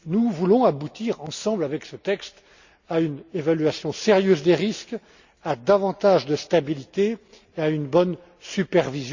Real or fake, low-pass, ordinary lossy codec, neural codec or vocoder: real; 7.2 kHz; Opus, 64 kbps; none